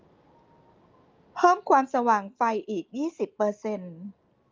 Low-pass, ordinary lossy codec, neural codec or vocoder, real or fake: 7.2 kHz; Opus, 24 kbps; none; real